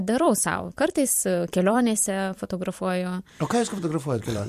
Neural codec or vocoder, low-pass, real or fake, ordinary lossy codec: vocoder, 44.1 kHz, 128 mel bands every 256 samples, BigVGAN v2; 14.4 kHz; fake; MP3, 64 kbps